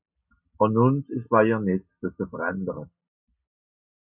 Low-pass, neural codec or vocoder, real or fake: 3.6 kHz; none; real